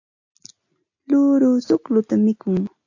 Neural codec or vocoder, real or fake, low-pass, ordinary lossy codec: none; real; 7.2 kHz; AAC, 48 kbps